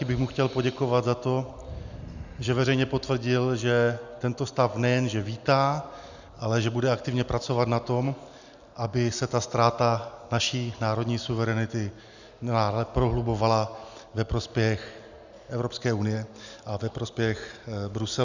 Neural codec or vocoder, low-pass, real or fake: none; 7.2 kHz; real